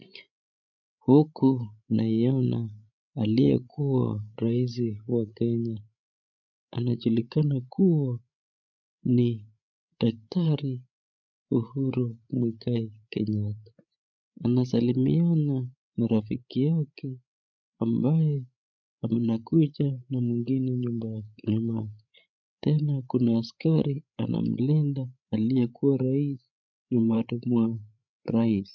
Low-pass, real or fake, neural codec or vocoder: 7.2 kHz; fake; codec, 16 kHz, 8 kbps, FreqCodec, larger model